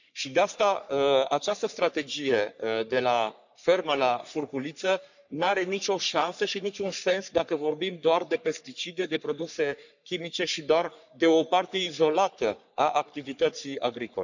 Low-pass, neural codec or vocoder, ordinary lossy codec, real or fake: 7.2 kHz; codec, 44.1 kHz, 3.4 kbps, Pupu-Codec; none; fake